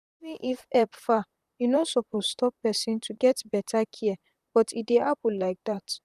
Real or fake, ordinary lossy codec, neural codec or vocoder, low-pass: fake; none; vocoder, 44.1 kHz, 128 mel bands, Pupu-Vocoder; 14.4 kHz